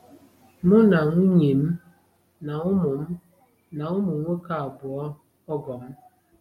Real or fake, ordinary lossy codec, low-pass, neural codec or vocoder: real; MP3, 64 kbps; 19.8 kHz; none